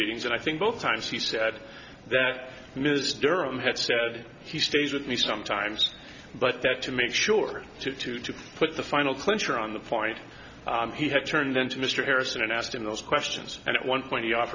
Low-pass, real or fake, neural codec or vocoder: 7.2 kHz; real; none